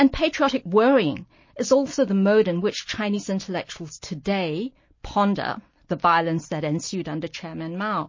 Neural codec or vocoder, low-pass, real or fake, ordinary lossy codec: none; 7.2 kHz; real; MP3, 32 kbps